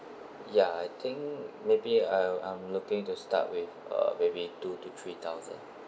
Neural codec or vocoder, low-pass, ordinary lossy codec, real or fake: none; none; none; real